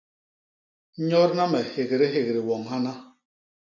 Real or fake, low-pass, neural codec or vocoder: real; 7.2 kHz; none